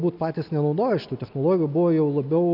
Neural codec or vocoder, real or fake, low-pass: none; real; 5.4 kHz